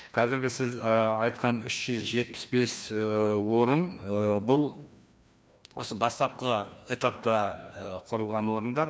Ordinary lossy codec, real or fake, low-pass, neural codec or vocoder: none; fake; none; codec, 16 kHz, 1 kbps, FreqCodec, larger model